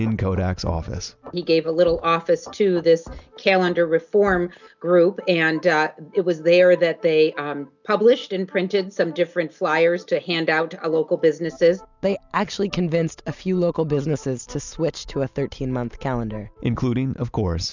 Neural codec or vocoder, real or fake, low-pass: none; real; 7.2 kHz